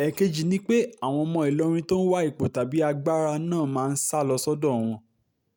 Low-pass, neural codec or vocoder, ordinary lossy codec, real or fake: none; none; none; real